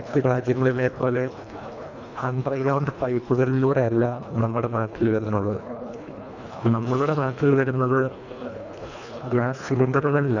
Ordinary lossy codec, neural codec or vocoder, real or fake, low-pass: none; codec, 24 kHz, 1.5 kbps, HILCodec; fake; 7.2 kHz